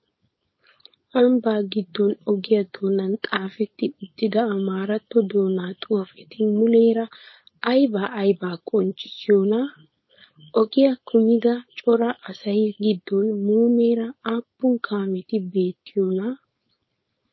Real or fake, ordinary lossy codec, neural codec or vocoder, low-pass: fake; MP3, 24 kbps; codec, 16 kHz, 4.8 kbps, FACodec; 7.2 kHz